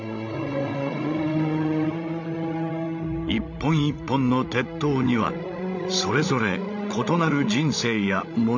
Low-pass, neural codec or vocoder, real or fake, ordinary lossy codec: 7.2 kHz; codec, 16 kHz, 16 kbps, FreqCodec, larger model; fake; none